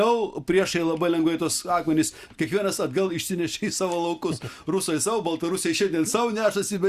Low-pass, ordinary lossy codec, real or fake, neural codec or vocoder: 14.4 kHz; Opus, 64 kbps; real; none